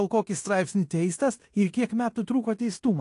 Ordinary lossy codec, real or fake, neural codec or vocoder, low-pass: AAC, 48 kbps; fake; codec, 16 kHz in and 24 kHz out, 0.9 kbps, LongCat-Audio-Codec, fine tuned four codebook decoder; 10.8 kHz